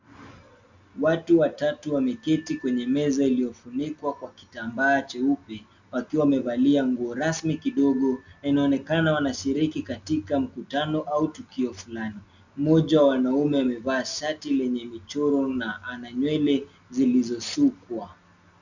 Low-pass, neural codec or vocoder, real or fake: 7.2 kHz; none; real